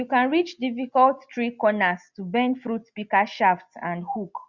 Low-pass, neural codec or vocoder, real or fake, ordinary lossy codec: 7.2 kHz; none; real; Opus, 64 kbps